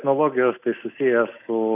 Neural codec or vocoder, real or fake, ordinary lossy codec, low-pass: none; real; AAC, 24 kbps; 3.6 kHz